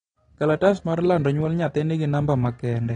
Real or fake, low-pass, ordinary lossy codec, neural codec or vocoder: real; 9.9 kHz; AAC, 32 kbps; none